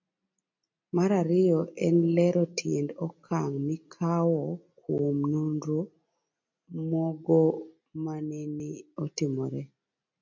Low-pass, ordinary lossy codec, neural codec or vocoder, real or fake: 7.2 kHz; MP3, 48 kbps; none; real